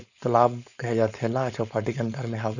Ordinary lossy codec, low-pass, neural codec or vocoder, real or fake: none; 7.2 kHz; codec, 16 kHz, 4.8 kbps, FACodec; fake